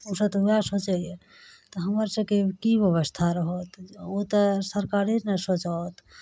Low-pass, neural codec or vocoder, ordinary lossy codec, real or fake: none; none; none; real